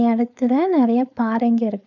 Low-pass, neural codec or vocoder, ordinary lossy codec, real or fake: 7.2 kHz; codec, 16 kHz, 4.8 kbps, FACodec; none; fake